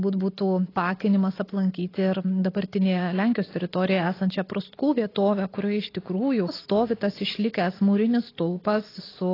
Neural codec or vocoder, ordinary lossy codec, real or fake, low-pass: none; AAC, 24 kbps; real; 5.4 kHz